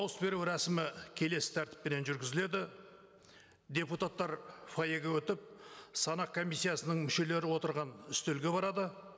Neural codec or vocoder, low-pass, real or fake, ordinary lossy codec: none; none; real; none